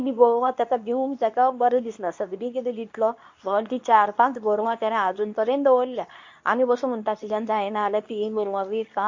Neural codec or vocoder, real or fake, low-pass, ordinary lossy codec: codec, 24 kHz, 0.9 kbps, WavTokenizer, medium speech release version 2; fake; 7.2 kHz; MP3, 48 kbps